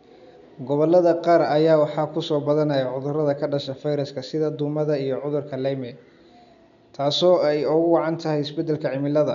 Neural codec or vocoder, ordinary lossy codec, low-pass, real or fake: none; none; 7.2 kHz; real